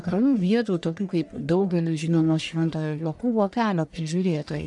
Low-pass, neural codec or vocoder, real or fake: 10.8 kHz; codec, 44.1 kHz, 1.7 kbps, Pupu-Codec; fake